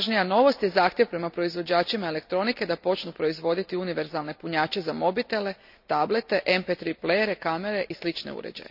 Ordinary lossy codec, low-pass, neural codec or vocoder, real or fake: none; 5.4 kHz; none; real